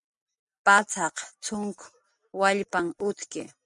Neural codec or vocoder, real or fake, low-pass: none; real; 10.8 kHz